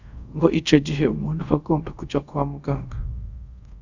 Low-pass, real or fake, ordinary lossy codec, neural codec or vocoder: 7.2 kHz; fake; Opus, 64 kbps; codec, 24 kHz, 0.5 kbps, DualCodec